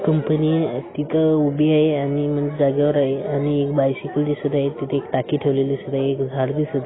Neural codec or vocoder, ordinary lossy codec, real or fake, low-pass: none; AAC, 16 kbps; real; 7.2 kHz